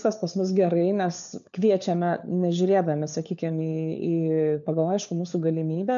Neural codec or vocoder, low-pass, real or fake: codec, 16 kHz, 4 kbps, FunCodec, trained on LibriTTS, 50 frames a second; 7.2 kHz; fake